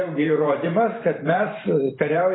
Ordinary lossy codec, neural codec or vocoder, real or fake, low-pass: AAC, 16 kbps; vocoder, 44.1 kHz, 128 mel bands every 512 samples, BigVGAN v2; fake; 7.2 kHz